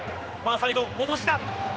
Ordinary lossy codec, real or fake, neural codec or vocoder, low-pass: none; fake; codec, 16 kHz, 2 kbps, X-Codec, HuBERT features, trained on general audio; none